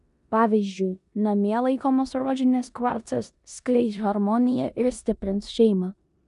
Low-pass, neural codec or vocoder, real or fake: 10.8 kHz; codec, 16 kHz in and 24 kHz out, 0.9 kbps, LongCat-Audio-Codec, four codebook decoder; fake